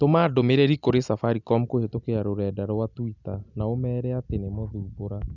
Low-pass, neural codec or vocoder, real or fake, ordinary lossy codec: 7.2 kHz; none; real; none